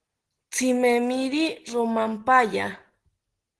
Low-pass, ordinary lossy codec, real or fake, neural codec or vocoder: 10.8 kHz; Opus, 16 kbps; real; none